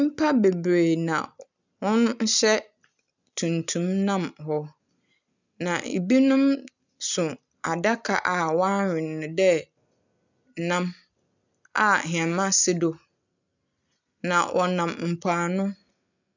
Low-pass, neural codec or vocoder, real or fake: 7.2 kHz; none; real